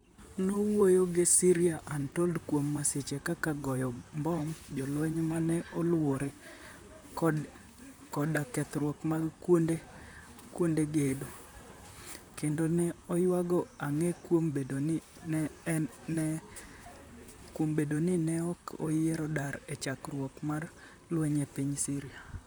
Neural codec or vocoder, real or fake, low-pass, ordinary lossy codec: vocoder, 44.1 kHz, 128 mel bands, Pupu-Vocoder; fake; none; none